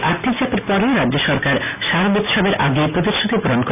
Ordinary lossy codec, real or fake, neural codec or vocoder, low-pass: none; real; none; 3.6 kHz